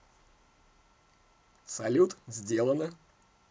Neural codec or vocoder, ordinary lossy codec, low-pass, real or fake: none; none; none; real